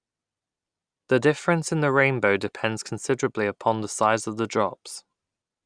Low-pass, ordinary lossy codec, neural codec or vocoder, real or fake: 9.9 kHz; none; none; real